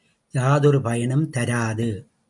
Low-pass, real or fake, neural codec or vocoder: 10.8 kHz; real; none